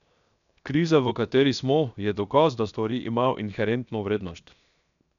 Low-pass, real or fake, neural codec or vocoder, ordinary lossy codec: 7.2 kHz; fake; codec, 16 kHz, 0.7 kbps, FocalCodec; none